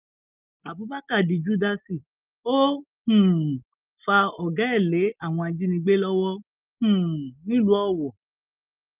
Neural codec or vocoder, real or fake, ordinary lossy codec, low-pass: none; real; Opus, 24 kbps; 3.6 kHz